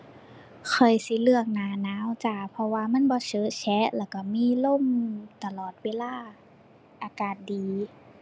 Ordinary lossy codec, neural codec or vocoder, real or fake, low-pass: none; none; real; none